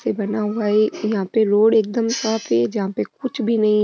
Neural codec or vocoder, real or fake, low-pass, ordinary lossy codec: none; real; none; none